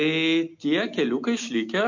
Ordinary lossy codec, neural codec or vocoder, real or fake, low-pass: MP3, 48 kbps; none; real; 7.2 kHz